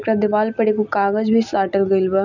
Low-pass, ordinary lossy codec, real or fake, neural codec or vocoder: 7.2 kHz; none; real; none